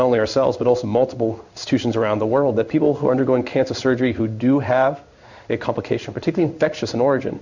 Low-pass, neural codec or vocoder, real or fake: 7.2 kHz; codec, 16 kHz in and 24 kHz out, 1 kbps, XY-Tokenizer; fake